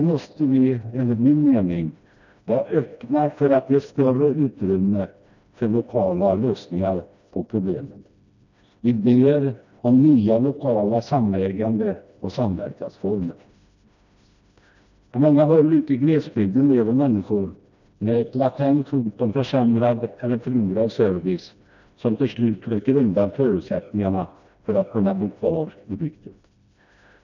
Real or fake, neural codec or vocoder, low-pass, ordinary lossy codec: fake; codec, 16 kHz, 1 kbps, FreqCodec, smaller model; 7.2 kHz; none